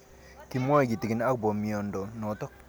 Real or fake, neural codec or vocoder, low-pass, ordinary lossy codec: real; none; none; none